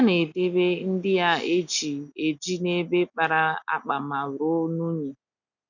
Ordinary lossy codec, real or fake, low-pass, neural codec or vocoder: none; real; 7.2 kHz; none